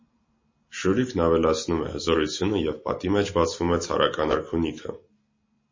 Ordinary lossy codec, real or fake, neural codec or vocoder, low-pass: MP3, 32 kbps; real; none; 7.2 kHz